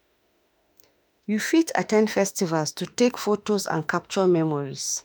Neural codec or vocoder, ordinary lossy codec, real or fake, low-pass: autoencoder, 48 kHz, 32 numbers a frame, DAC-VAE, trained on Japanese speech; none; fake; none